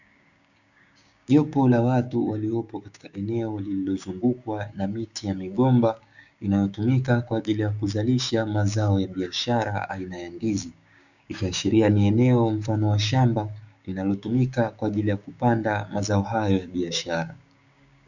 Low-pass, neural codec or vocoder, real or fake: 7.2 kHz; codec, 16 kHz, 6 kbps, DAC; fake